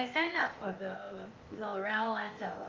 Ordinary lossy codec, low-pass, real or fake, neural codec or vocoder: Opus, 32 kbps; 7.2 kHz; fake; codec, 16 kHz, 0.8 kbps, ZipCodec